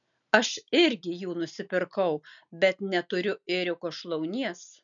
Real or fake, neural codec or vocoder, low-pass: real; none; 7.2 kHz